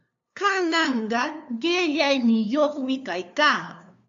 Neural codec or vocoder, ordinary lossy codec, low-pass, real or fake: codec, 16 kHz, 2 kbps, FunCodec, trained on LibriTTS, 25 frames a second; AAC, 64 kbps; 7.2 kHz; fake